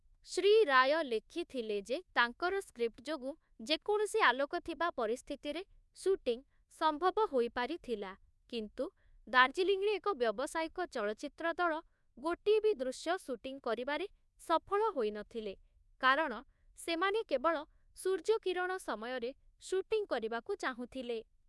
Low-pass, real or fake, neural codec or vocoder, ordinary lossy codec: none; fake; codec, 24 kHz, 1.2 kbps, DualCodec; none